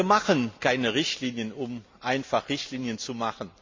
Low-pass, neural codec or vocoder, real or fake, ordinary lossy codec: 7.2 kHz; none; real; MP3, 48 kbps